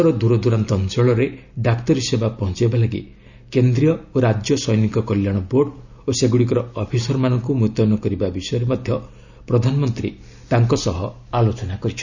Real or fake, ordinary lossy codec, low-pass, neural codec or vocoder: real; none; 7.2 kHz; none